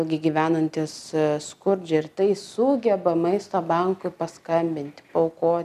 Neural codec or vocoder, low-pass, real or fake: none; 14.4 kHz; real